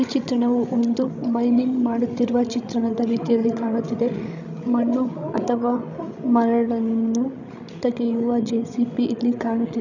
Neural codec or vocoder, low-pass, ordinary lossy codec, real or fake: codec, 16 kHz, 16 kbps, FreqCodec, larger model; 7.2 kHz; none; fake